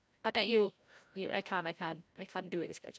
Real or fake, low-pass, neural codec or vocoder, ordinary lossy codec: fake; none; codec, 16 kHz, 0.5 kbps, FreqCodec, larger model; none